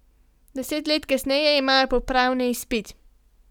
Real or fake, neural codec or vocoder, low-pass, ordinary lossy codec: real; none; 19.8 kHz; none